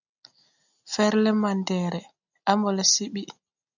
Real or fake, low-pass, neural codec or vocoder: real; 7.2 kHz; none